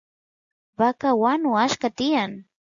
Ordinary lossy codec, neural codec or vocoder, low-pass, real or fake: Opus, 64 kbps; none; 7.2 kHz; real